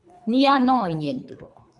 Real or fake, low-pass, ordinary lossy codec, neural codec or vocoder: fake; 10.8 kHz; MP3, 96 kbps; codec, 24 kHz, 3 kbps, HILCodec